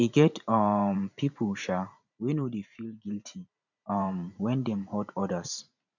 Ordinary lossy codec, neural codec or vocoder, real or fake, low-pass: none; vocoder, 44.1 kHz, 128 mel bands every 512 samples, BigVGAN v2; fake; 7.2 kHz